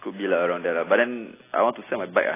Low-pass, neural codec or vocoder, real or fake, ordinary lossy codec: 3.6 kHz; none; real; AAC, 16 kbps